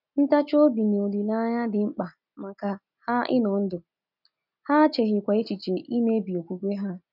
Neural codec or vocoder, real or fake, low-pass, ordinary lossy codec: none; real; 5.4 kHz; none